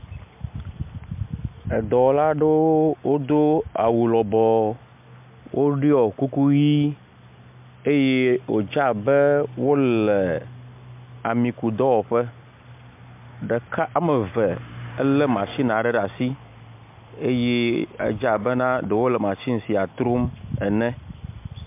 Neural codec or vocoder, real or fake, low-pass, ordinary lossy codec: none; real; 3.6 kHz; MP3, 32 kbps